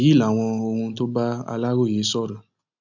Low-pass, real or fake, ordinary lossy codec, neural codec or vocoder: 7.2 kHz; real; none; none